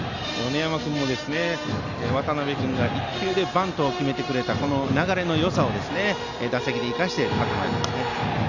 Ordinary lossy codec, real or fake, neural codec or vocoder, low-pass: Opus, 64 kbps; real; none; 7.2 kHz